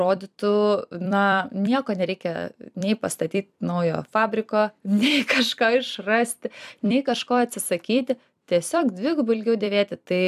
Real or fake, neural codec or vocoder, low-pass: fake; vocoder, 44.1 kHz, 128 mel bands every 256 samples, BigVGAN v2; 14.4 kHz